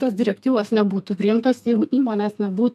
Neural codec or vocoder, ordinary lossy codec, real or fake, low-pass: codec, 32 kHz, 1.9 kbps, SNAC; MP3, 96 kbps; fake; 14.4 kHz